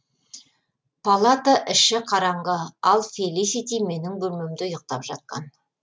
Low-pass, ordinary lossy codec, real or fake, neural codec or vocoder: none; none; real; none